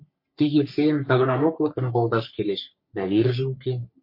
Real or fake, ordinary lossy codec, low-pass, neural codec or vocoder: fake; MP3, 32 kbps; 5.4 kHz; codec, 44.1 kHz, 3.4 kbps, Pupu-Codec